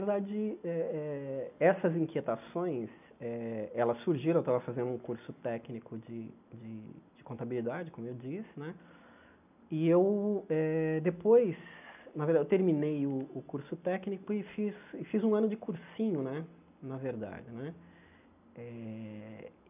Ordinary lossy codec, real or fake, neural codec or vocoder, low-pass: none; real; none; 3.6 kHz